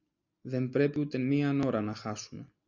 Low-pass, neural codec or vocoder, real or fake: 7.2 kHz; none; real